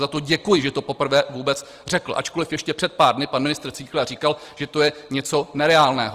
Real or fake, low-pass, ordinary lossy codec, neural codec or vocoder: real; 14.4 kHz; Opus, 24 kbps; none